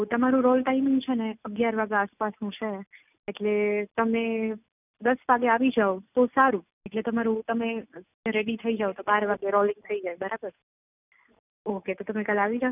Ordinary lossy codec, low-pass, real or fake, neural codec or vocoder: none; 3.6 kHz; real; none